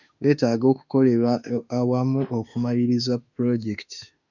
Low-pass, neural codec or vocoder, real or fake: 7.2 kHz; autoencoder, 48 kHz, 32 numbers a frame, DAC-VAE, trained on Japanese speech; fake